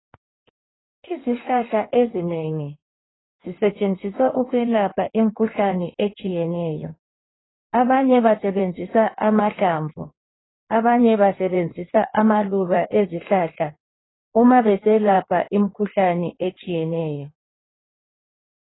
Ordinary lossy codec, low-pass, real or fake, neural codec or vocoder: AAC, 16 kbps; 7.2 kHz; fake; codec, 16 kHz in and 24 kHz out, 1.1 kbps, FireRedTTS-2 codec